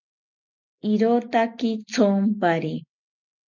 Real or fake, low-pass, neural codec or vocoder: real; 7.2 kHz; none